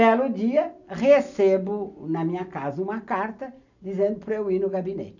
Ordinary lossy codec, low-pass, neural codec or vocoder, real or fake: none; 7.2 kHz; none; real